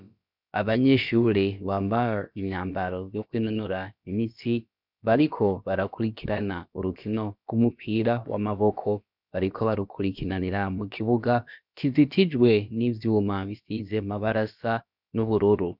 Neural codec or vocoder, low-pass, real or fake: codec, 16 kHz, about 1 kbps, DyCAST, with the encoder's durations; 5.4 kHz; fake